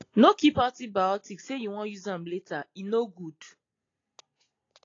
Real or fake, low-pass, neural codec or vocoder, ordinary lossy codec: real; 7.2 kHz; none; AAC, 32 kbps